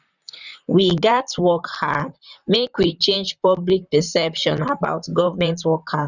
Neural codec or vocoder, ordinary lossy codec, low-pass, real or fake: vocoder, 44.1 kHz, 128 mel bands, Pupu-Vocoder; none; 7.2 kHz; fake